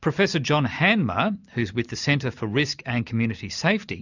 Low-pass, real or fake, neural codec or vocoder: 7.2 kHz; real; none